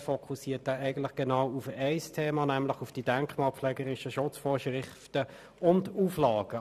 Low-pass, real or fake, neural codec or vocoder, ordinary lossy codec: 14.4 kHz; fake; vocoder, 44.1 kHz, 128 mel bands every 512 samples, BigVGAN v2; none